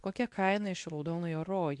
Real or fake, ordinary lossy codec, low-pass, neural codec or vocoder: fake; MP3, 64 kbps; 10.8 kHz; codec, 24 kHz, 0.9 kbps, WavTokenizer, medium speech release version 2